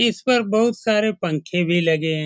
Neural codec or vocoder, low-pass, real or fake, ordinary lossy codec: none; none; real; none